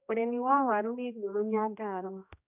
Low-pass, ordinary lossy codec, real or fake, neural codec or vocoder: 3.6 kHz; none; fake; codec, 16 kHz, 1 kbps, X-Codec, HuBERT features, trained on general audio